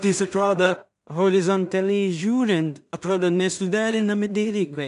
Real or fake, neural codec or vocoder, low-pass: fake; codec, 16 kHz in and 24 kHz out, 0.4 kbps, LongCat-Audio-Codec, two codebook decoder; 10.8 kHz